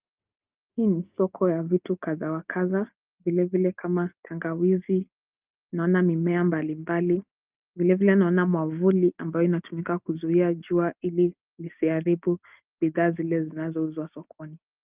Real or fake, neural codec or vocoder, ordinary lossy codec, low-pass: real; none; Opus, 32 kbps; 3.6 kHz